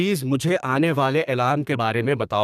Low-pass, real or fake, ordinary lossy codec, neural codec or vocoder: 14.4 kHz; fake; none; codec, 32 kHz, 1.9 kbps, SNAC